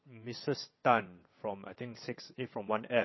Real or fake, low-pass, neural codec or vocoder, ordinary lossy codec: fake; 7.2 kHz; vocoder, 22.05 kHz, 80 mel bands, WaveNeXt; MP3, 24 kbps